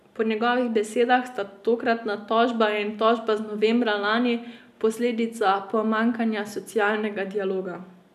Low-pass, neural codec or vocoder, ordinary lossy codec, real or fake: 14.4 kHz; none; none; real